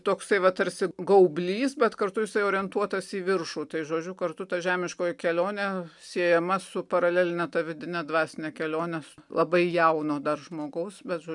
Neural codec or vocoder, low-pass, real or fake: none; 10.8 kHz; real